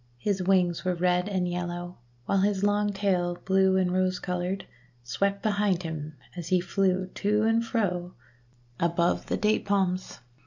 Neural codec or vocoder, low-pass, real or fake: none; 7.2 kHz; real